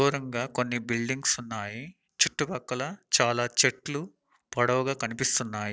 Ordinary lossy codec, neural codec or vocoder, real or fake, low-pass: none; none; real; none